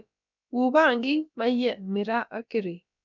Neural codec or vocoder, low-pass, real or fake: codec, 16 kHz, about 1 kbps, DyCAST, with the encoder's durations; 7.2 kHz; fake